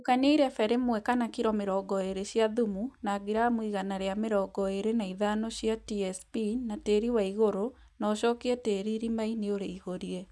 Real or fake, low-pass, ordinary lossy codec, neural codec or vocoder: real; none; none; none